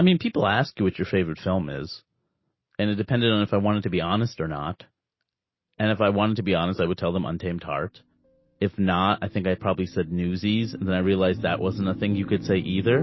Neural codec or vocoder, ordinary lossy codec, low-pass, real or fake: none; MP3, 24 kbps; 7.2 kHz; real